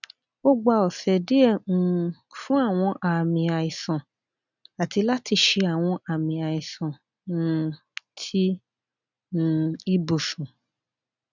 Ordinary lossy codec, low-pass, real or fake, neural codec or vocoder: none; 7.2 kHz; real; none